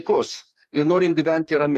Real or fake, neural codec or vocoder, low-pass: fake; codec, 44.1 kHz, 2.6 kbps, DAC; 14.4 kHz